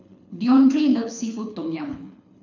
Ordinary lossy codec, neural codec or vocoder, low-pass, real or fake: none; codec, 24 kHz, 6 kbps, HILCodec; 7.2 kHz; fake